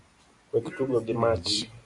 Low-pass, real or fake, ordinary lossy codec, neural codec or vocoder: 10.8 kHz; real; MP3, 48 kbps; none